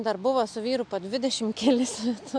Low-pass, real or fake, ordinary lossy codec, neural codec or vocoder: 9.9 kHz; real; AAC, 64 kbps; none